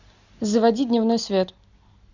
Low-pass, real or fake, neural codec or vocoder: 7.2 kHz; real; none